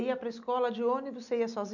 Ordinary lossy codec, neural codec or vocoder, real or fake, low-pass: none; none; real; 7.2 kHz